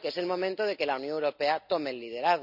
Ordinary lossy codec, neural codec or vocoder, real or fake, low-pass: none; none; real; 5.4 kHz